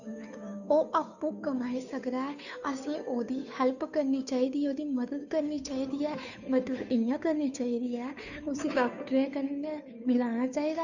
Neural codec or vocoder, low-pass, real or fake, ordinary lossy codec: codec, 16 kHz, 2 kbps, FunCodec, trained on Chinese and English, 25 frames a second; 7.2 kHz; fake; none